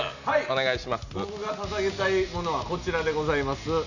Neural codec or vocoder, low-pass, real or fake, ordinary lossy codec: none; 7.2 kHz; real; none